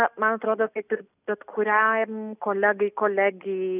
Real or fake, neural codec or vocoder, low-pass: real; none; 3.6 kHz